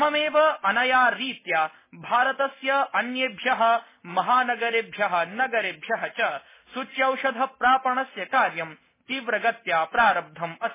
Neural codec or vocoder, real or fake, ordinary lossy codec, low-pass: none; real; MP3, 16 kbps; 3.6 kHz